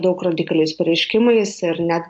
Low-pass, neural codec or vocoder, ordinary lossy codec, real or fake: 10.8 kHz; none; MP3, 48 kbps; real